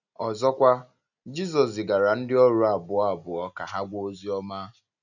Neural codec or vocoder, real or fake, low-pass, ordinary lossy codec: none; real; 7.2 kHz; none